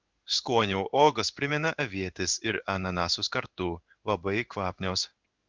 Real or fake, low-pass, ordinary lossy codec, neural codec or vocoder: fake; 7.2 kHz; Opus, 24 kbps; codec, 16 kHz in and 24 kHz out, 1 kbps, XY-Tokenizer